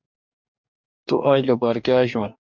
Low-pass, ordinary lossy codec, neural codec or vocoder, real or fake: 7.2 kHz; MP3, 64 kbps; codec, 44.1 kHz, 2.6 kbps, DAC; fake